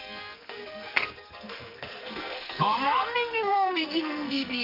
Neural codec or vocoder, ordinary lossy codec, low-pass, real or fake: codec, 44.1 kHz, 2.6 kbps, SNAC; none; 5.4 kHz; fake